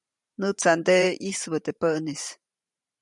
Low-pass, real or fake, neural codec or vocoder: 10.8 kHz; fake; vocoder, 44.1 kHz, 128 mel bands every 512 samples, BigVGAN v2